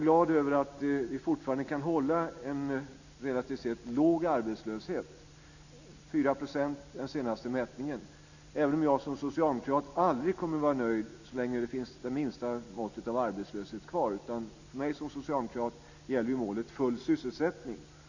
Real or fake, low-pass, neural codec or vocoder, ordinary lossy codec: real; 7.2 kHz; none; none